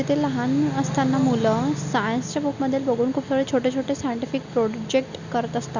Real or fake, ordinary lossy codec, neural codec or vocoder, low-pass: real; Opus, 64 kbps; none; 7.2 kHz